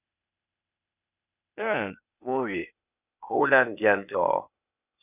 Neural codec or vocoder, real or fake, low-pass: codec, 16 kHz, 0.8 kbps, ZipCodec; fake; 3.6 kHz